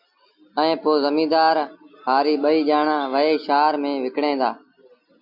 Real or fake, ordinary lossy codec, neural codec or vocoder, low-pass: real; MP3, 48 kbps; none; 5.4 kHz